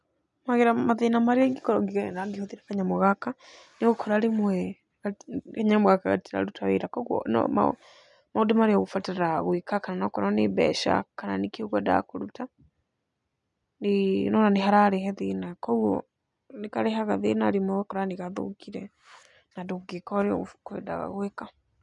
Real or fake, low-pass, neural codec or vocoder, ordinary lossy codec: real; 10.8 kHz; none; none